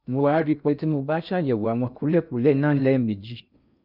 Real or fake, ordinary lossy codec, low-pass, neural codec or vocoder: fake; none; 5.4 kHz; codec, 16 kHz in and 24 kHz out, 0.6 kbps, FocalCodec, streaming, 2048 codes